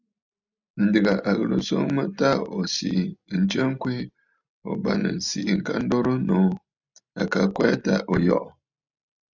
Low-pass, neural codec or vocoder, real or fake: 7.2 kHz; none; real